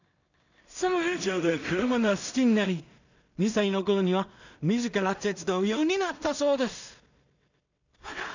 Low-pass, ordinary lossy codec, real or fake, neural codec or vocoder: 7.2 kHz; none; fake; codec, 16 kHz in and 24 kHz out, 0.4 kbps, LongCat-Audio-Codec, two codebook decoder